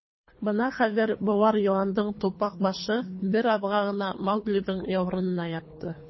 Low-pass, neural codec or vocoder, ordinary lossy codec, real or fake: 7.2 kHz; codec, 24 kHz, 3 kbps, HILCodec; MP3, 24 kbps; fake